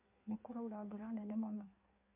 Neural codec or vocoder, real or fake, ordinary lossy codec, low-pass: codec, 16 kHz in and 24 kHz out, 1.1 kbps, FireRedTTS-2 codec; fake; MP3, 32 kbps; 3.6 kHz